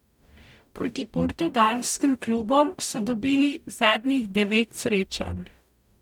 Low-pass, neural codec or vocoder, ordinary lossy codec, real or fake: 19.8 kHz; codec, 44.1 kHz, 0.9 kbps, DAC; none; fake